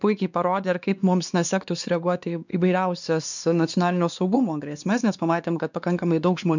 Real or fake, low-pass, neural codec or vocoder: fake; 7.2 kHz; codec, 16 kHz, 2 kbps, X-Codec, WavLM features, trained on Multilingual LibriSpeech